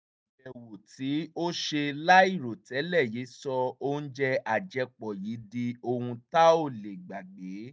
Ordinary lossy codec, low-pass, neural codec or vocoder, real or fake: none; none; none; real